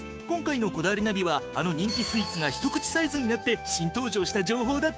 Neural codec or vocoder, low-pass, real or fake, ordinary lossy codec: codec, 16 kHz, 6 kbps, DAC; none; fake; none